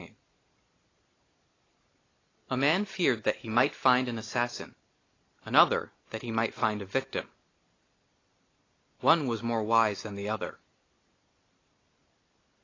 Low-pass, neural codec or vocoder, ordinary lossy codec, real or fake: 7.2 kHz; none; AAC, 32 kbps; real